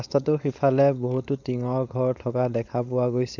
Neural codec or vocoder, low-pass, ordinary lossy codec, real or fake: codec, 16 kHz, 4.8 kbps, FACodec; 7.2 kHz; none; fake